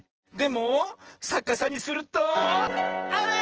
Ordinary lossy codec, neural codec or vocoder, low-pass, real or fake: Opus, 16 kbps; vocoder, 24 kHz, 100 mel bands, Vocos; 7.2 kHz; fake